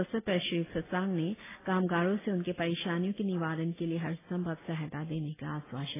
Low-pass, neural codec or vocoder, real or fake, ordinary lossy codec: 3.6 kHz; none; real; AAC, 16 kbps